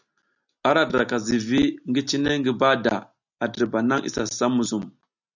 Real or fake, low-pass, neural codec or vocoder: real; 7.2 kHz; none